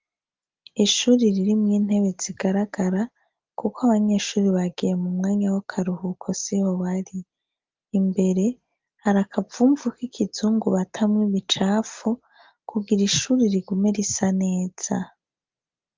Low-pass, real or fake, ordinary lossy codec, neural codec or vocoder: 7.2 kHz; real; Opus, 24 kbps; none